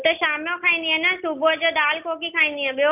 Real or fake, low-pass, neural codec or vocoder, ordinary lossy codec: real; 3.6 kHz; none; none